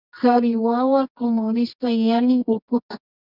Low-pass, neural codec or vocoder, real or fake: 5.4 kHz; codec, 24 kHz, 0.9 kbps, WavTokenizer, medium music audio release; fake